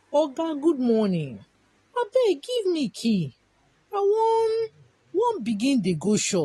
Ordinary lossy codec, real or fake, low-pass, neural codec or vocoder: AAC, 32 kbps; real; 14.4 kHz; none